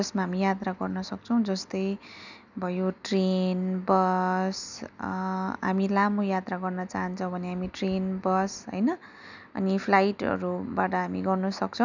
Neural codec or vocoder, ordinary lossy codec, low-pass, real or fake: none; none; 7.2 kHz; real